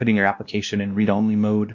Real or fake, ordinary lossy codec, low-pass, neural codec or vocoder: fake; MP3, 64 kbps; 7.2 kHz; codec, 16 kHz, 1 kbps, X-Codec, WavLM features, trained on Multilingual LibriSpeech